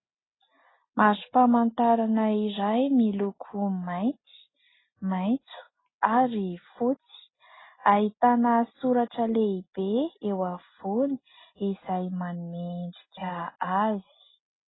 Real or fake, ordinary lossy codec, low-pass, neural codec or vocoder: real; AAC, 16 kbps; 7.2 kHz; none